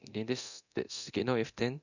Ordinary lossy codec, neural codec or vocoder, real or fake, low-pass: none; codec, 24 kHz, 0.9 kbps, DualCodec; fake; 7.2 kHz